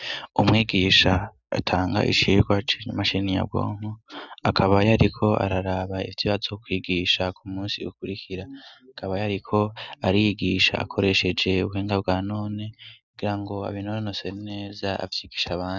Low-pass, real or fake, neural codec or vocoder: 7.2 kHz; real; none